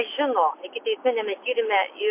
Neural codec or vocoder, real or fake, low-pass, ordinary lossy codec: none; real; 3.6 kHz; MP3, 24 kbps